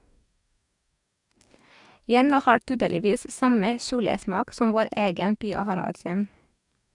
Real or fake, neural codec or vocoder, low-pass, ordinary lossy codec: fake; codec, 44.1 kHz, 2.6 kbps, DAC; 10.8 kHz; none